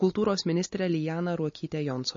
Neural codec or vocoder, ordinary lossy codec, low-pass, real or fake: none; MP3, 32 kbps; 7.2 kHz; real